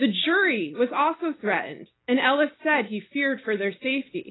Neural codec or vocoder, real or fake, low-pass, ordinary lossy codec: none; real; 7.2 kHz; AAC, 16 kbps